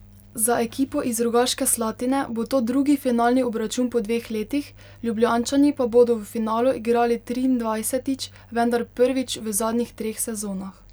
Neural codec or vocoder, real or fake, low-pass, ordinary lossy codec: none; real; none; none